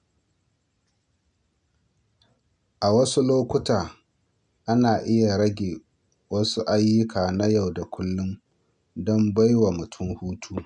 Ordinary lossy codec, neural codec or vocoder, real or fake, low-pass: none; none; real; 9.9 kHz